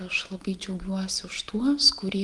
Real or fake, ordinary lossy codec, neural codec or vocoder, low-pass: real; Opus, 24 kbps; none; 10.8 kHz